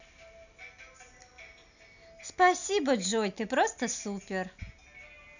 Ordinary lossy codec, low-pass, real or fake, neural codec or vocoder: none; 7.2 kHz; real; none